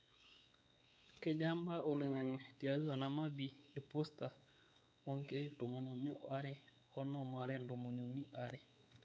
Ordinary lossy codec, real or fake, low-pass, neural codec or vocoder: none; fake; none; codec, 16 kHz, 4 kbps, X-Codec, WavLM features, trained on Multilingual LibriSpeech